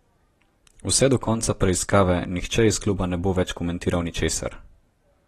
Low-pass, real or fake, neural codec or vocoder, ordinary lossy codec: 19.8 kHz; real; none; AAC, 32 kbps